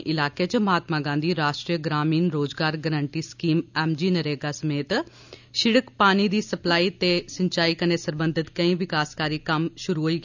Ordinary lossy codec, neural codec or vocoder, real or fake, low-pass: none; none; real; 7.2 kHz